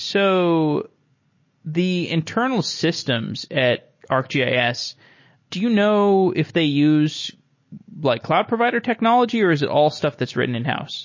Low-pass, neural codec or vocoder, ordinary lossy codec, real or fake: 7.2 kHz; none; MP3, 32 kbps; real